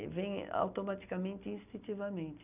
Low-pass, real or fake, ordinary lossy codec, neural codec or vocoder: 3.6 kHz; real; none; none